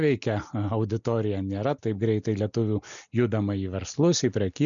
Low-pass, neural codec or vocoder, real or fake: 7.2 kHz; none; real